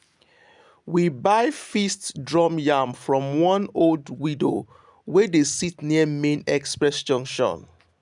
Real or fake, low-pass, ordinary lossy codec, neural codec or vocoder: real; 10.8 kHz; none; none